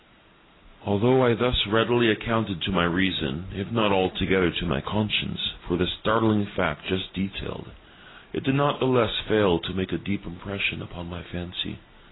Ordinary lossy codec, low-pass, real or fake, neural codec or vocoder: AAC, 16 kbps; 7.2 kHz; real; none